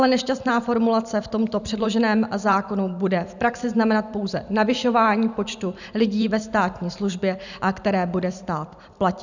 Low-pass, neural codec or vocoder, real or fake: 7.2 kHz; vocoder, 44.1 kHz, 128 mel bands every 512 samples, BigVGAN v2; fake